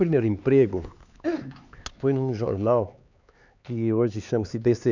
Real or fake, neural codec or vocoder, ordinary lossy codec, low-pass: fake; codec, 16 kHz, 4 kbps, X-Codec, HuBERT features, trained on LibriSpeech; none; 7.2 kHz